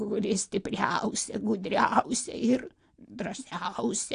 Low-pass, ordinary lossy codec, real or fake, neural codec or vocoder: 9.9 kHz; AAC, 48 kbps; real; none